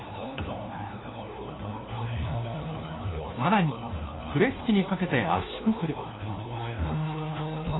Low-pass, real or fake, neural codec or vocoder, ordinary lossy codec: 7.2 kHz; fake; codec, 16 kHz, 1 kbps, FunCodec, trained on LibriTTS, 50 frames a second; AAC, 16 kbps